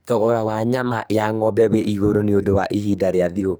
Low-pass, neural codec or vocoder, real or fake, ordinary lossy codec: none; codec, 44.1 kHz, 2.6 kbps, SNAC; fake; none